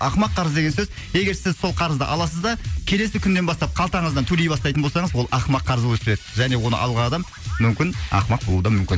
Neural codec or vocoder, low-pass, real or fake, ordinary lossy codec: none; none; real; none